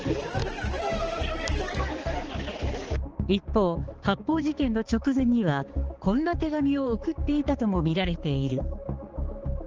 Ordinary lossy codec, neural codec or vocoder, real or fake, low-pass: Opus, 16 kbps; codec, 16 kHz, 4 kbps, X-Codec, HuBERT features, trained on balanced general audio; fake; 7.2 kHz